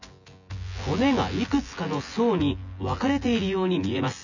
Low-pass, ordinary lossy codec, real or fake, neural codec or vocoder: 7.2 kHz; none; fake; vocoder, 24 kHz, 100 mel bands, Vocos